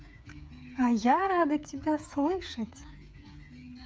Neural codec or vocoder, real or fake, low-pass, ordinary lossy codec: codec, 16 kHz, 8 kbps, FreqCodec, smaller model; fake; none; none